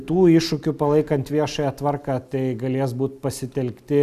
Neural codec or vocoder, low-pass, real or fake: none; 14.4 kHz; real